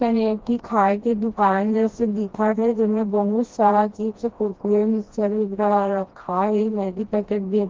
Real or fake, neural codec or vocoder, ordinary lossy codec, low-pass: fake; codec, 16 kHz, 1 kbps, FreqCodec, smaller model; Opus, 16 kbps; 7.2 kHz